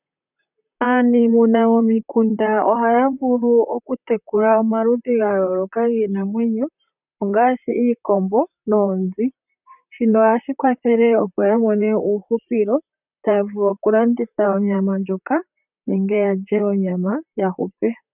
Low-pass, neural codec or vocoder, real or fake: 3.6 kHz; vocoder, 44.1 kHz, 128 mel bands, Pupu-Vocoder; fake